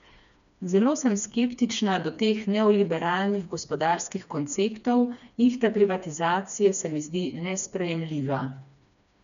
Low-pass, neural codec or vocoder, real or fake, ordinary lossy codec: 7.2 kHz; codec, 16 kHz, 2 kbps, FreqCodec, smaller model; fake; none